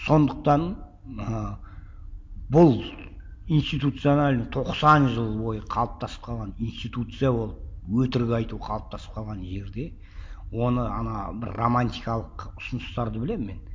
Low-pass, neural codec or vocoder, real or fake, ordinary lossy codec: none; none; real; none